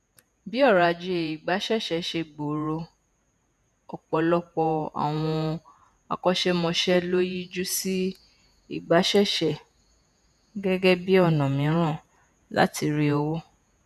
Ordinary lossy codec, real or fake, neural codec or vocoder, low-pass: none; fake; vocoder, 48 kHz, 128 mel bands, Vocos; 14.4 kHz